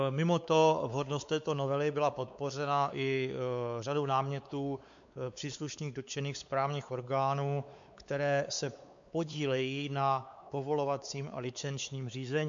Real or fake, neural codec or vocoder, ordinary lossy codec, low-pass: fake; codec, 16 kHz, 4 kbps, X-Codec, WavLM features, trained on Multilingual LibriSpeech; MP3, 64 kbps; 7.2 kHz